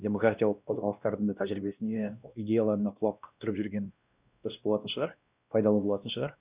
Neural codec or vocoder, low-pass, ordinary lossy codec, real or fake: codec, 16 kHz, 1 kbps, X-Codec, WavLM features, trained on Multilingual LibriSpeech; 3.6 kHz; Opus, 64 kbps; fake